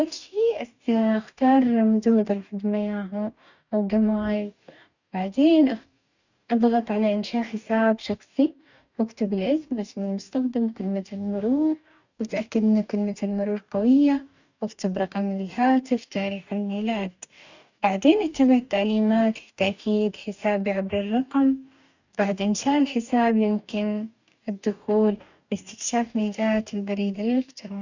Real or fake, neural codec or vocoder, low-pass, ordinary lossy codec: fake; codec, 44.1 kHz, 2.6 kbps, DAC; 7.2 kHz; none